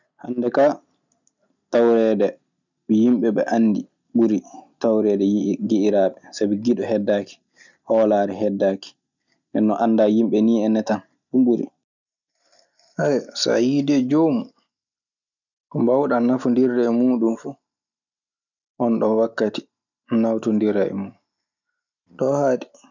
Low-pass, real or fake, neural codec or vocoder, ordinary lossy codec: 7.2 kHz; real; none; none